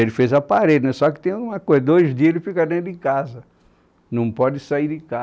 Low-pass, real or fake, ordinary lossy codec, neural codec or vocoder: none; real; none; none